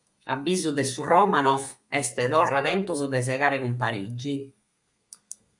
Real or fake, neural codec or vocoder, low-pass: fake; codec, 32 kHz, 1.9 kbps, SNAC; 10.8 kHz